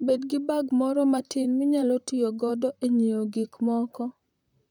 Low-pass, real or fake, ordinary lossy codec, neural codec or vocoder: 19.8 kHz; fake; none; vocoder, 44.1 kHz, 128 mel bands, Pupu-Vocoder